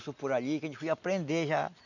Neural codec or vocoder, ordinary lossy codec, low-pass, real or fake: none; none; 7.2 kHz; real